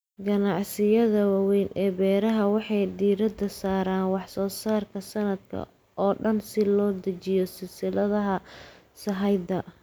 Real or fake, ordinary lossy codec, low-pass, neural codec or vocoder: real; none; none; none